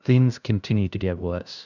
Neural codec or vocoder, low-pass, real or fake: codec, 16 kHz, 0.5 kbps, FunCodec, trained on LibriTTS, 25 frames a second; 7.2 kHz; fake